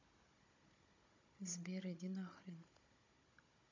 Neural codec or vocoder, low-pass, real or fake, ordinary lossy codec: codec, 16 kHz, 16 kbps, FunCodec, trained on Chinese and English, 50 frames a second; 7.2 kHz; fake; MP3, 48 kbps